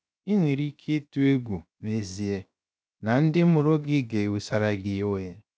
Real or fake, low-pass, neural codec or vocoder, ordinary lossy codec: fake; none; codec, 16 kHz, 0.7 kbps, FocalCodec; none